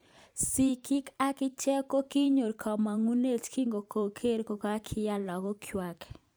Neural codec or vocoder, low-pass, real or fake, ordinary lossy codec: vocoder, 44.1 kHz, 128 mel bands every 512 samples, BigVGAN v2; none; fake; none